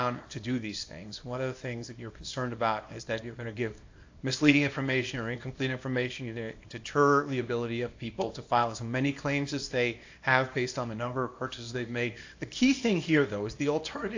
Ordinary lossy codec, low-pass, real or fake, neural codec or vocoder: AAC, 48 kbps; 7.2 kHz; fake; codec, 24 kHz, 0.9 kbps, WavTokenizer, small release